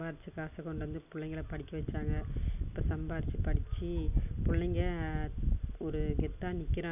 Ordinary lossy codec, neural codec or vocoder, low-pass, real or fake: none; none; 3.6 kHz; real